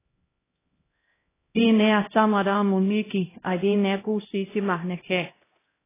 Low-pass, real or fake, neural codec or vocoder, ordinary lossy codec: 3.6 kHz; fake; codec, 16 kHz, 0.5 kbps, X-Codec, HuBERT features, trained on LibriSpeech; AAC, 16 kbps